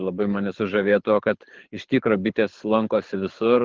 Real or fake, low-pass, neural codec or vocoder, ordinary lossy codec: fake; 7.2 kHz; codec, 24 kHz, 6 kbps, HILCodec; Opus, 16 kbps